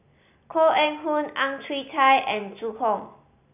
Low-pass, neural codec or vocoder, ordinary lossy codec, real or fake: 3.6 kHz; none; none; real